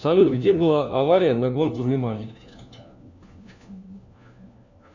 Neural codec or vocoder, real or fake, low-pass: codec, 16 kHz, 1 kbps, FunCodec, trained on LibriTTS, 50 frames a second; fake; 7.2 kHz